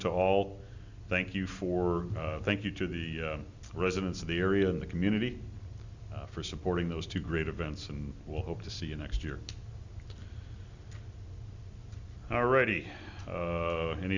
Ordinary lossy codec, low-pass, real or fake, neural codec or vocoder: Opus, 64 kbps; 7.2 kHz; real; none